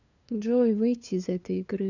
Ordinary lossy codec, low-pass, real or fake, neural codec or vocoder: none; 7.2 kHz; fake; codec, 16 kHz, 2 kbps, FunCodec, trained on LibriTTS, 25 frames a second